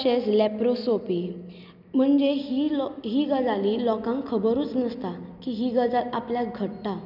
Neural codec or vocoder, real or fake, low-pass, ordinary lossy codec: none; real; 5.4 kHz; none